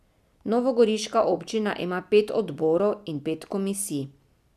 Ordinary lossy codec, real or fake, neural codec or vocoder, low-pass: none; real; none; 14.4 kHz